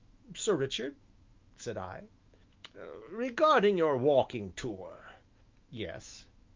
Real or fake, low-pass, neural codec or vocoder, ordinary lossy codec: fake; 7.2 kHz; autoencoder, 48 kHz, 128 numbers a frame, DAC-VAE, trained on Japanese speech; Opus, 24 kbps